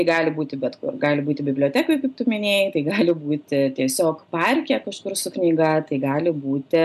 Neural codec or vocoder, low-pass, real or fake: none; 14.4 kHz; real